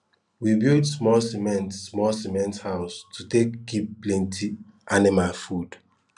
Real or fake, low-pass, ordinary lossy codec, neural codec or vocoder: real; 10.8 kHz; none; none